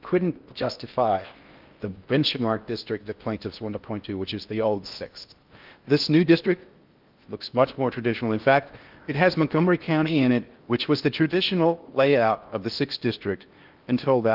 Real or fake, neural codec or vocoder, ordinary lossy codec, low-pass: fake; codec, 16 kHz in and 24 kHz out, 0.6 kbps, FocalCodec, streaming, 4096 codes; Opus, 32 kbps; 5.4 kHz